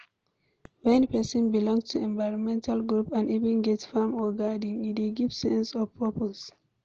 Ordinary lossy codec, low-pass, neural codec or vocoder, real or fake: Opus, 16 kbps; 7.2 kHz; none; real